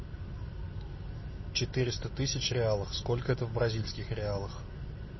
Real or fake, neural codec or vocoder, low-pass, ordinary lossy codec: real; none; 7.2 kHz; MP3, 24 kbps